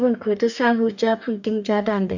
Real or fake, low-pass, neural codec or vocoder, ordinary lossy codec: fake; 7.2 kHz; codec, 44.1 kHz, 2.6 kbps, DAC; none